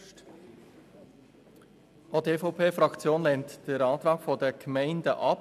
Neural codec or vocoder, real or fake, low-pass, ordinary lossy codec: none; real; 14.4 kHz; none